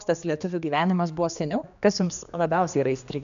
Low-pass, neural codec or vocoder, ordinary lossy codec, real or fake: 7.2 kHz; codec, 16 kHz, 2 kbps, X-Codec, HuBERT features, trained on general audio; MP3, 96 kbps; fake